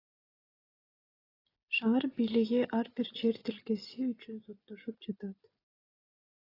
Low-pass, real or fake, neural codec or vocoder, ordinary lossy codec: 5.4 kHz; real; none; AAC, 24 kbps